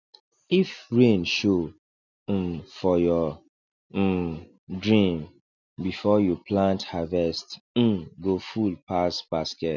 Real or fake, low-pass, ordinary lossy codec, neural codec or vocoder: real; 7.2 kHz; none; none